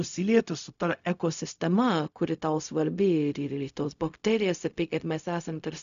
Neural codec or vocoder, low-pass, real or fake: codec, 16 kHz, 0.4 kbps, LongCat-Audio-Codec; 7.2 kHz; fake